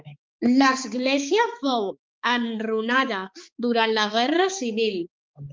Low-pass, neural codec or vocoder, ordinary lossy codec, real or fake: 7.2 kHz; codec, 16 kHz, 4 kbps, X-Codec, HuBERT features, trained on balanced general audio; Opus, 24 kbps; fake